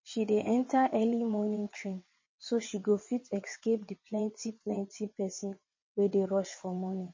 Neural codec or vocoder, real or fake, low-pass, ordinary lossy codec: vocoder, 22.05 kHz, 80 mel bands, WaveNeXt; fake; 7.2 kHz; MP3, 32 kbps